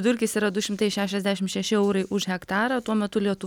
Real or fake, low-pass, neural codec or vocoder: real; 19.8 kHz; none